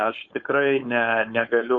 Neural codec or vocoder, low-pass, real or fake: codec, 16 kHz, 4.8 kbps, FACodec; 7.2 kHz; fake